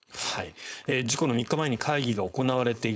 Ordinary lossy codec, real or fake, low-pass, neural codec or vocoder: none; fake; none; codec, 16 kHz, 4.8 kbps, FACodec